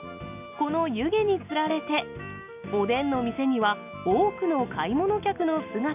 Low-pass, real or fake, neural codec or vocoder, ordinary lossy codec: 3.6 kHz; real; none; AAC, 32 kbps